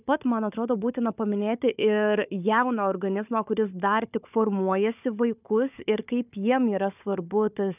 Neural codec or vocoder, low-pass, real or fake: codec, 16 kHz, 4 kbps, FunCodec, trained on Chinese and English, 50 frames a second; 3.6 kHz; fake